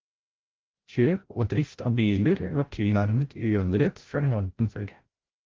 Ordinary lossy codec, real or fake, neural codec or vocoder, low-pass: Opus, 16 kbps; fake; codec, 16 kHz, 0.5 kbps, FreqCodec, larger model; 7.2 kHz